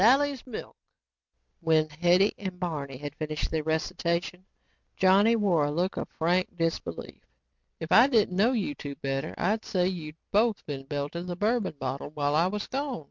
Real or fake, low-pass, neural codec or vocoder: real; 7.2 kHz; none